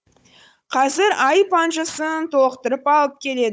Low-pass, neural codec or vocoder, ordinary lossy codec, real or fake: none; codec, 16 kHz, 16 kbps, FunCodec, trained on Chinese and English, 50 frames a second; none; fake